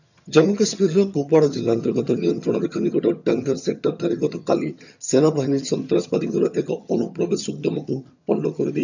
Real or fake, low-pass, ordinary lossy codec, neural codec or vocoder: fake; 7.2 kHz; none; vocoder, 22.05 kHz, 80 mel bands, HiFi-GAN